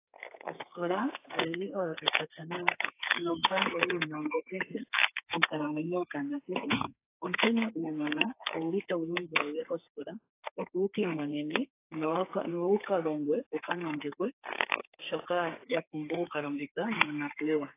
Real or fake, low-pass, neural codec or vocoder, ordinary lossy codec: fake; 3.6 kHz; codec, 32 kHz, 1.9 kbps, SNAC; AAC, 24 kbps